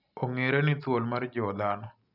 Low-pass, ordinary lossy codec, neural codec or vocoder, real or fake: 5.4 kHz; none; none; real